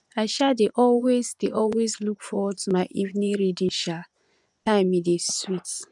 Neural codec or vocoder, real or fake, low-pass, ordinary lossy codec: vocoder, 24 kHz, 100 mel bands, Vocos; fake; 10.8 kHz; none